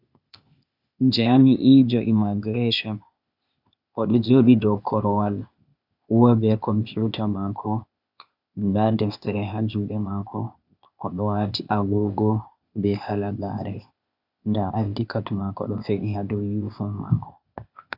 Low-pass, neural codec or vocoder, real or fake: 5.4 kHz; codec, 16 kHz, 0.8 kbps, ZipCodec; fake